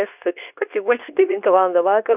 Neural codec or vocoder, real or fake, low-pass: codec, 24 kHz, 0.9 kbps, WavTokenizer, medium speech release version 2; fake; 3.6 kHz